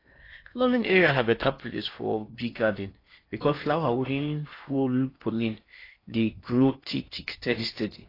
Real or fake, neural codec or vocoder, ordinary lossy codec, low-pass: fake; codec, 16 kHz in and 24 kHz out, 0.8 kbps, FocalCodec, streaming, 65536 codes; AAC, 32 kbps; 5.4 kHz